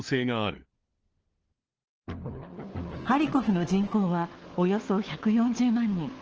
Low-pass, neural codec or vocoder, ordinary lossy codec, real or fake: 7.2 kHz; codec, 16 kHz, 4 kbps, FunCodec, trained on LibriTTS, 50 frames a second; Opus, 16 kbps; fake